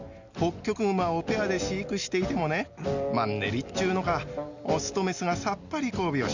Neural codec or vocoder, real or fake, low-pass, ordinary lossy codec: none; real; 7.2 kHz; Opus, 64 kbps